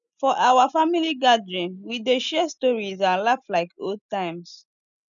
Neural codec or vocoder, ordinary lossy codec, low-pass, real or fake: none; none; 7.2 kHz; real